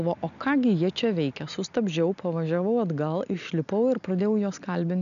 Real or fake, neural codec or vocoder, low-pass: real; none; 7.2 kHz